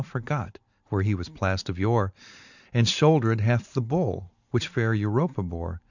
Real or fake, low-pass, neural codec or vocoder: fake; 7.2 kHz; vocoder, 44.1 kHz, 128 mel bands every 512 samples, BigVGAN v2